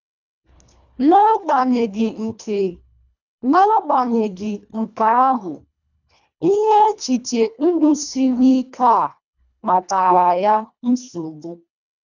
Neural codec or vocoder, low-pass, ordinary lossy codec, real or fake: codec, 24 kHz, 1.5 kbps, HILCodec; 7.2 kHz; none; fake